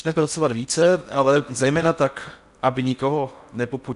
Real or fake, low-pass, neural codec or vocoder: fake; 10.8 kHz; codec, 16 kHz in and 24 kHz out, 0.6 kbps, FocalCodec, streaming, 4096 codes